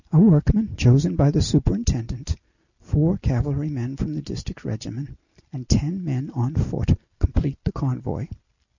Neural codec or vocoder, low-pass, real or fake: none; 7.2 kHz; real